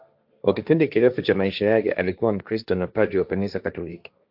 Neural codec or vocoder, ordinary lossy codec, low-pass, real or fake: codec, 16 kHz, 1.1 kbps, Voila-Tokenizer; AAC, 48 kbps; 5.4 kHz; fake